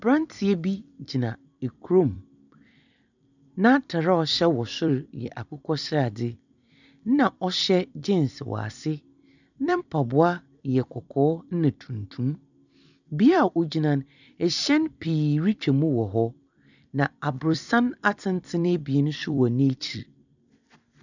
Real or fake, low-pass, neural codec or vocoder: fake; 7.2 kHz; vocoder, 44.1 kHz, 128 mel bands every 256 samples, BigVGAN v2